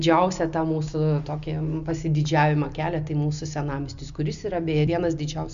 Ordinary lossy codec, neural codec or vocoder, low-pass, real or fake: AAC, 96 kbps; none; 7.2 kHz; real